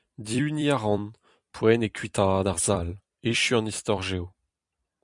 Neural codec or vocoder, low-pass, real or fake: vocoder, 44.1 kHz, 128 mel bands every 256 samples, BigVGAN v2; 10.8 kHz; fake